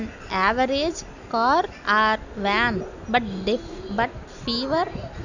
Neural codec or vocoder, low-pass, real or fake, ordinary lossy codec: none; 7.2 kHz; real; none